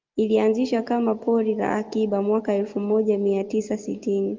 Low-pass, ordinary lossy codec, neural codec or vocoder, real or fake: 7.2 kHz; Opus, 24 kbps; none; real